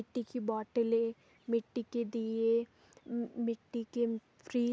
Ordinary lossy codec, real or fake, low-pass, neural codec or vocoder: none; real; none; none